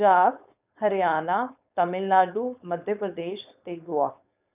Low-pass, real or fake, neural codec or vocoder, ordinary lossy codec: 3.6 kHz; fake; codec, 16 kHz, 4.8 kbps, FACodec; none